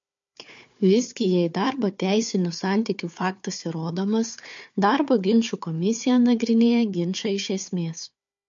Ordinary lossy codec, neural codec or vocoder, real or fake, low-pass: MP3, 48 kbps; codec, 16 kHz, 4 kbps, FunCodec, trained on Chinese and English, 50 frames a second; fake; 7.2 kHz